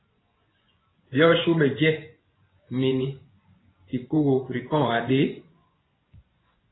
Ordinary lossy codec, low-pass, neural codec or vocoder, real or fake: AAC, 16 kbps; 7.2 kHz; vocoder, 24 kHz, 100 mel bands, Vocos; fake